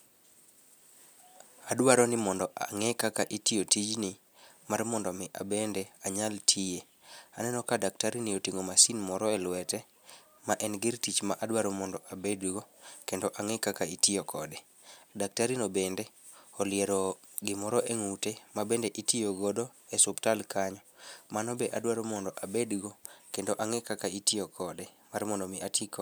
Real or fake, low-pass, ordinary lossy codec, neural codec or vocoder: real; none; none; none